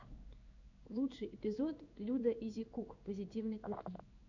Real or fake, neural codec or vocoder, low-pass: fake; codec, 16 kHz in and 24 kHz out, 1 kbps, XY-Tokenizer; 7.2 kHz